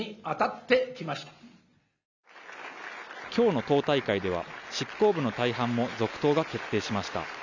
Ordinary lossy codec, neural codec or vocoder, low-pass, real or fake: none; none; 7.2 kHz; real